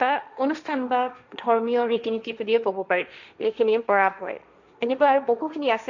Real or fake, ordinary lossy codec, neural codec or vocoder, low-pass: fake; none; codec, 16 kHz, 1.1 kbps, Voila-Tokenizer; 7.2 kHz